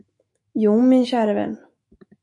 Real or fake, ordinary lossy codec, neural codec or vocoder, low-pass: fake; MP3, 48 kbps; autoencoder, 48 kHz, 128 numbers a frame, DAC-VAE, trained on Japanese speech; 10.8 kHz